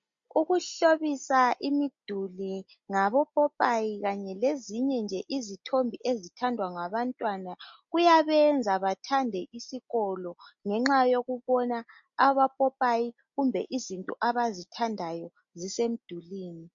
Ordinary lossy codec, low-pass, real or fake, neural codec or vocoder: MP3, 48 kbps; 7.2 kHz; real; none